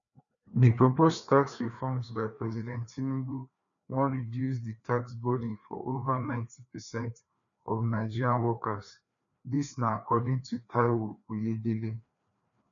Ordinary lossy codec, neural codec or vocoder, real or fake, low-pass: MP3, 96 kbps; codec, 16 kHz, 2 kbps, FreqCodec, larger model; fake; 7.2 kHz